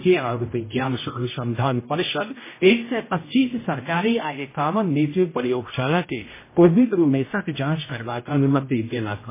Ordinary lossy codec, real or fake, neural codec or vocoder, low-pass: MP3, 16 kbps; fake; codec, 16 kHz, 0.5 kbps, X-Codec, HuBERT features, trained on general audio; 3.6 kHz